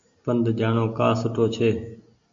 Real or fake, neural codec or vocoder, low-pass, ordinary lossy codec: real; none; 7.2 kHz; MP3, 48 kbps